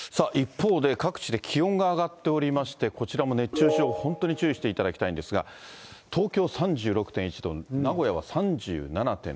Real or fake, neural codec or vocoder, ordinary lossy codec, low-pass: real; none; none; none